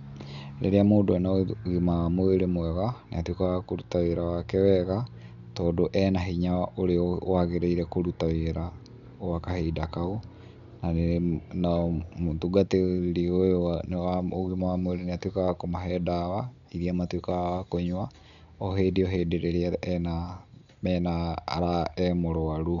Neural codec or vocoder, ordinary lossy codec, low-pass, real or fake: none; none; 7.2 kHz; real